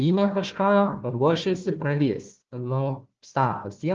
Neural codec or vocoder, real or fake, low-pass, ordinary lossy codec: codec, 16 kHz, 1 kbps, FunCodec, trained on Chinese and English, 50 frames a second; fake; 7.2 kHz; Opus, 16 kbps